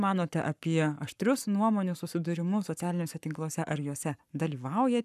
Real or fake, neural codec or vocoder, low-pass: fake; codec, 44.1 kHz, 7.8 kbps, Pupu-Codec; 14.4 kHz